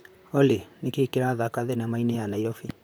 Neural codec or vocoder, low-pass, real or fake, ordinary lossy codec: vocoder, 44.1 kHz, 128 mel bands, Pupu-Vocoder; none; fake; none